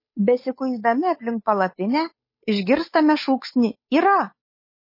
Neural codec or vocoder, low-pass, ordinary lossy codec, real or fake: codec, 16 kHz, 8 kbps, FunCodec, trained on Chinese and English, 25 frames a second; 5.4 kHz; MP3, 24 kbps; fake